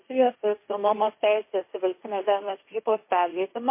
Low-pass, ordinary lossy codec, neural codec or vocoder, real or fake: 3.6 kHz; MP3, 24 kbps; codec, 16 kHz, 1.1 kbps, Voila-Tokenizer; fake